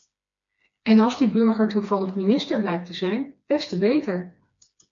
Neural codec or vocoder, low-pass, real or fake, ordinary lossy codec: codec, 16 kHz, 2 kbps, FreqCodec, smaller model; 7.2 kHz; fake; MP3, 64 kbps